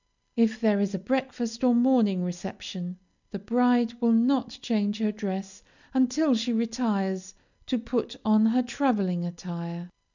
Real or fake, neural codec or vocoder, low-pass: real; none; 7.2 kHz